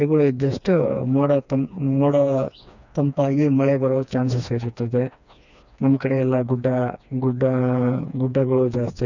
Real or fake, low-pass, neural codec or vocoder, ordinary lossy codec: fake; 7.2 kHz; codec, 16 kHz, 2 kbps, FreqCodec, smaller model; none